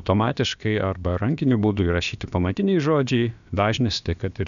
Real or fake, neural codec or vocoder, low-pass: fake; codec, 16 kHz, about 1 kbps, DyCAST, with the encoder's durations; 7.2 kHz